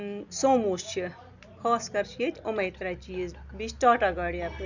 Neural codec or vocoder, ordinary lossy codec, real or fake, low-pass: none; none; real; 7.2 kHz